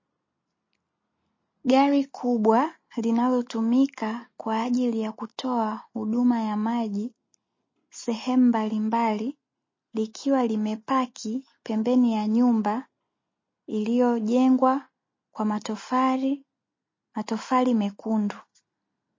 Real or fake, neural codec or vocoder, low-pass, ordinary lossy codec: real; none; 7.2 kHz; MP3, 32 kbps